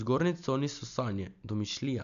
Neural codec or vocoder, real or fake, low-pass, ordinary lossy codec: none; real; 7.2 kHz; none